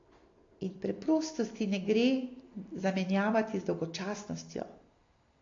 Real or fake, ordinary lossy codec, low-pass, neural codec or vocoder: real; AAC, 32 kbps; 7.2 kHz; none